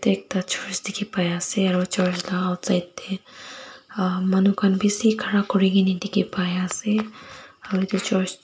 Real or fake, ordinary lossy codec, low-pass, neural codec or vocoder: real; none; none; none